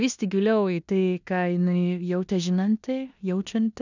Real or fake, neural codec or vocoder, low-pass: fake; codec, 16 kHz in and 24 kHz out, 0.9 kbps, LongCat-Audio-Codec, four codebook decoder; 7.2 kHz